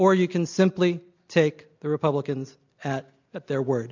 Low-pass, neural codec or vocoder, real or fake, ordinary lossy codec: 7.2 kHz; none; real; MP3, 64 kbps